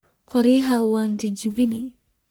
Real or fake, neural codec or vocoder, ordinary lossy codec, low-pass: fake; codec, 44.1 kHz, 1.7 kbps, Pupu-Codec; none; none